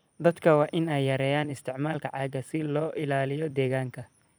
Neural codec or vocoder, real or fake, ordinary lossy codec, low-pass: vocoder, 44.1 kHz, 128 mel bands every 256 samples, BigVGAN v2; fake; none; none